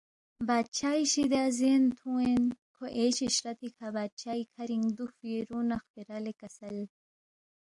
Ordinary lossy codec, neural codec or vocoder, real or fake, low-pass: AAC, 64 kbps; none; real; 10.8 kHz